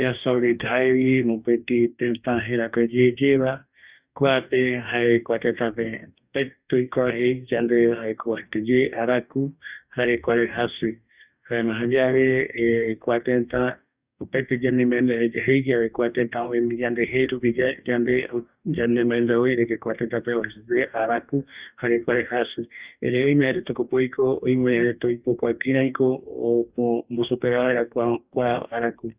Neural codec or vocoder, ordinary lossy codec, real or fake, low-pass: codec, 44.1 kHz, 2.6 kbps, DAC; Opus, 64 kbps; fake; 3.6 kHz